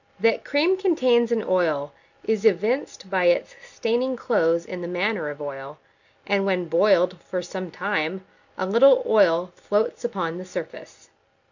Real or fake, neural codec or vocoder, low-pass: real; none; 7.2 kHz